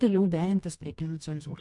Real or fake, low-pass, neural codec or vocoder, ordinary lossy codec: fake; 10.8 kHz; codec, 24 kHz, 0.9 kbps, WavTokenizer, medium music audio release; MP3, 64 kbps